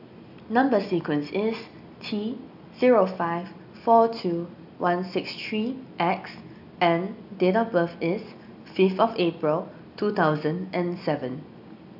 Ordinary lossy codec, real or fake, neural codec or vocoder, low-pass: AAC, 48 kbps; real; none; 5.4 kHz